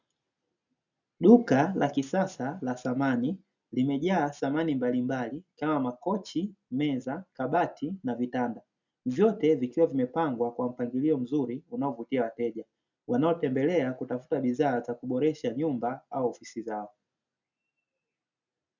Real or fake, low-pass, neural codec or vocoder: real; 7.2 kHz; none